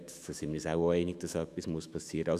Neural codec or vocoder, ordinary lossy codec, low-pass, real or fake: autoencoder, 48 kHz, 128 numbers a frame, DAC-VAE, trained on Japanese speech; none; 14.4 kHz; fake